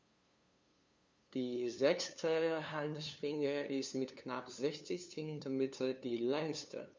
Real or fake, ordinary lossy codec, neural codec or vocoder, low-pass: fake; none; codec, 16 kHz, 2 kbps, FunCodec, trained on LibriTTS, 25 frames a second; none